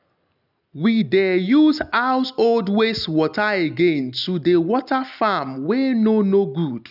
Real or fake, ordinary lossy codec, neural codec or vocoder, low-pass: real; none; none; 5.4 kHz